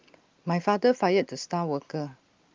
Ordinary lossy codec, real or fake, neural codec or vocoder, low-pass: Opus, 24 kbps; real; none; 7.2 kHz